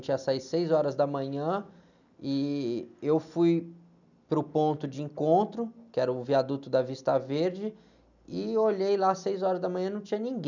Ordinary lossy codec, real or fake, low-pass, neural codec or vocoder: none; real; 7.2 kHz; none